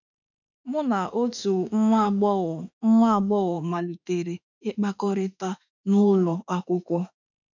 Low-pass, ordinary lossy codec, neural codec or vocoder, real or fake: 7.2 kHz; none; autoencoder, 48 kHz, 32 numbers a frame, DAC-VAE, trained on Japanese speech; fake